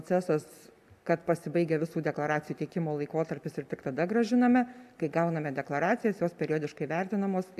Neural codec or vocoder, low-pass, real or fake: none; 14.4 kHz; real